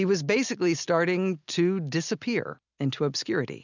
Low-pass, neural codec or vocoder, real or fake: 7.2 kHz; none; real